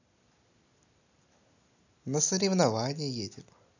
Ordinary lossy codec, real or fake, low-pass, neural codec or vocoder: none; real; 7.2 kHz; none